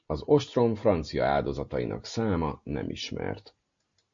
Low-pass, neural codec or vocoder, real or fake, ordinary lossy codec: 7.2 kHz; none; real; MP3, 48 kbps